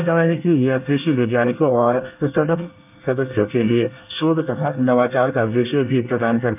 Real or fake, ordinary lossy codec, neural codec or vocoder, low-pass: fake; none; codec, 24 kHz, 1 kbps, SNAC; 3.6 kHz